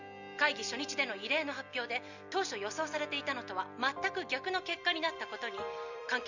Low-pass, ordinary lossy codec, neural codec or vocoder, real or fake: 7.2 kHz; none; vocoder, 44.1 kHz, 128 mel bands every 256 samples, BigVGAN v2; fake